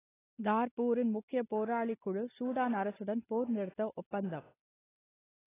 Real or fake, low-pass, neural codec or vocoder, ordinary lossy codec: real; 3.6 kHz; none; AAC, 16 kbps